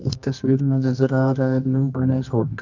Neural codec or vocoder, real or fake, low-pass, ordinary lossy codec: codec, 24 kHz, 0.9 kbps, WavTokenizer, medium music audio release; fake; 7.2 kHz; none